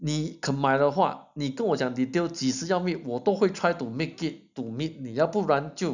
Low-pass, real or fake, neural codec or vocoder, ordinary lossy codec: 7.2 kHz; real; none; none